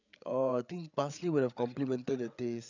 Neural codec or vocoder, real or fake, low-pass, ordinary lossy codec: codec, 16 kHz, 8 kbps, FreqCodec, larger model; fake; 7.2 kHz; none